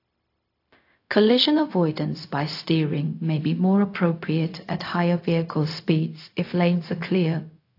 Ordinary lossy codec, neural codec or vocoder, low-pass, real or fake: AAC, 32 kbps; codec, 16 kHz, 0.4 kbps, LongCat-Audio-Codec; 5.4 kHz; fake